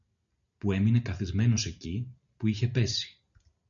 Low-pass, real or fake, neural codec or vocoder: 7.2 kHz; real; none